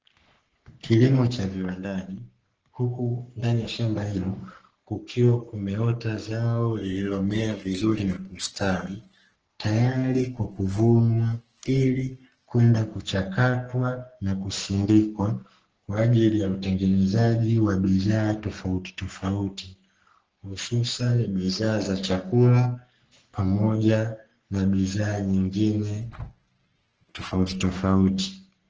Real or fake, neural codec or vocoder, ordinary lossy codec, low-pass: fake; codec, 44.1 kHz, 3.4 kbps, Pupu-Codec; Opus, 16 kbps; 7.2 kHz